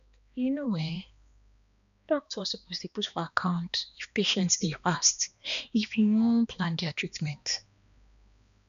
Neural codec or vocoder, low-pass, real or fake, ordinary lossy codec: codec, 16 kHz, 2 kbps, X-Codec, HuBERT features, trained on balanced general audio; 7.2 kHz; fake; none